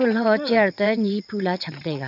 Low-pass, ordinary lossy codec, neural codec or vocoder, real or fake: 5.4 kHz; none; vocoder, 22.05 kHz, 80 mel bands, WaveNeXt; fake